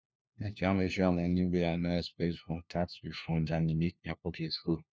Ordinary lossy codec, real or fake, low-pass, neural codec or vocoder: none; fake; none; codec, 16 kHz, 1 kbps, FunCodec, trained on LibriTTS, 50 frames a second